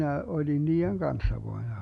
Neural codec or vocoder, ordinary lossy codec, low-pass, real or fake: none; none; 10.8 kHz; real